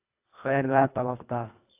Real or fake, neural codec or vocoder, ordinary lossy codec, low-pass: fake; codec, 24 kHz, 1.5 kbps, HILCodec; AAC, 24 kbps; 3.6 kHz